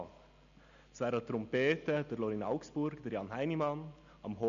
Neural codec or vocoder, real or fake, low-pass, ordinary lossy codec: none; real; 7.2 kHz; none